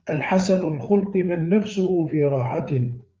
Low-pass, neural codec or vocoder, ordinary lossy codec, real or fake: 7.2 kHz; codec, 16 kHz, 4 kbps, FreqCodec, larger model; Opus, 24 kbps; fake